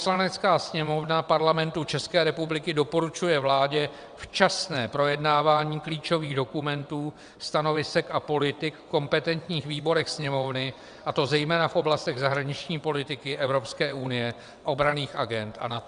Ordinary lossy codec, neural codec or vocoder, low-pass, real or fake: Opus, 64 kbps; vocoder, 22.05 kHz, 80 mel bands, WaveNeXt; 9.9 kHz; fake